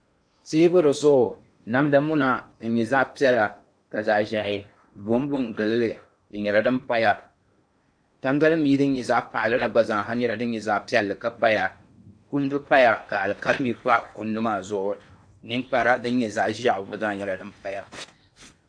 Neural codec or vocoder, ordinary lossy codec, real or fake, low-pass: codec, 16 kHz in and 24 kHz out, 0.8 kbps, FocalCodec, streaming, 65536 codes; AAC, 64 kbps; fake; 9.9 kHz